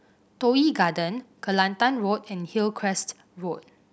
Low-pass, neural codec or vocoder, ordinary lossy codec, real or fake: none; none; none; real